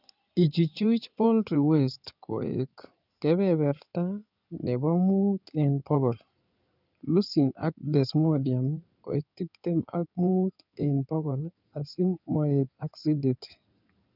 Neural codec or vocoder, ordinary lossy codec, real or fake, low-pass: codec, 16 kHz in and 24 kHz out, 2.2 kbps, FireRedTTS-2 codec; none; fake; 5.4 kHz